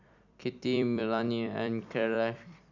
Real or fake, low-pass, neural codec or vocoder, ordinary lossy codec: fake; 7.2 kHz; vocoder, 44.1 kHz, 128 mel bands every 256 samples, BigVGAN v2; none